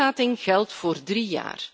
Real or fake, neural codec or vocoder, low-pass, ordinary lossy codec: real; none; none; none